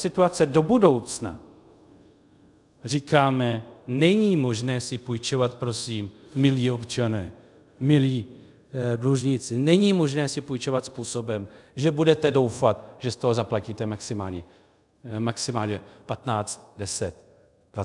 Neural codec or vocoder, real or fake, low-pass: codec, 24 kHz, 0.5 kbps, DualCodec; fake; 10.8 kHz